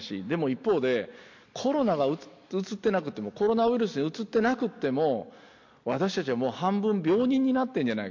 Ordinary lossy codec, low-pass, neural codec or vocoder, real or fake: MP3, 48 kbps; 7.2 kHz; none; real